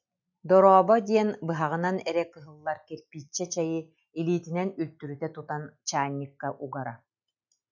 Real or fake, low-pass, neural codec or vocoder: real; 7.2 kHz; none